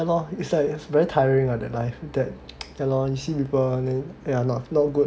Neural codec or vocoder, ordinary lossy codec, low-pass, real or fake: none; none; none; real